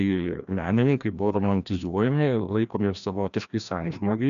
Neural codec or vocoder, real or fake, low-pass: codec, 16 kHz, 1 kbps, FreqCodec, larger model; fake; 7.2 kHz